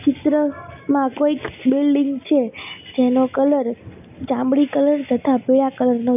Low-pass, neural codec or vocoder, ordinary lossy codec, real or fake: 3.6 kHz; none; none; real